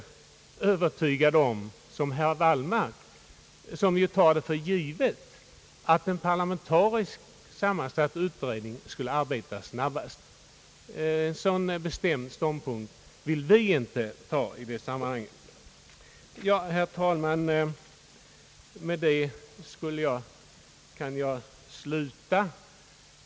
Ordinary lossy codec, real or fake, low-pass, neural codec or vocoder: none; real; none; none